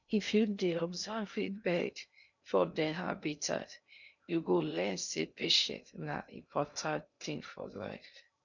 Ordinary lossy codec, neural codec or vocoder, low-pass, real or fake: none; codec, 16 kHz in and 24 kHz out, 0.6 kbps, FocalCodec, streaming, 2048 codes; 7.2 kHz; fake